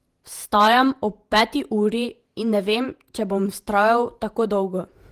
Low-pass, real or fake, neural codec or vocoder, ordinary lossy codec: 14.4 kHz; fake; vocoder, 48 kHz, 128 mel bands, Vocos; Opus, 24 kbps